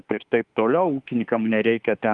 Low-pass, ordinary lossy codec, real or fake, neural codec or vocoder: 10.8 kHz; Opus, 32 kbps; fake; autoencoder, 48 kHz, 32 numbers a frame, DAC-VAE, trained on Japanese speech